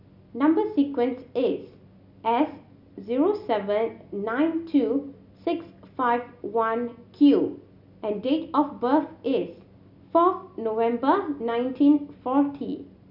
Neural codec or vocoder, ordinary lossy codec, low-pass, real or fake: none; none; 5.4 kHz; real